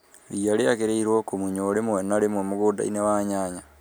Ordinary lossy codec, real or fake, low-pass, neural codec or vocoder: none; real; none; none